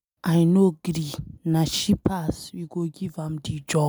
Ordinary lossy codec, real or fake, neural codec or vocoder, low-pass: none; real; none; none